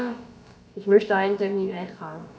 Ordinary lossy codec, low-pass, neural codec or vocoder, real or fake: none; none; codec, 16 kHz, about 1 kbps, DyCAST, with the encoder's durations; fake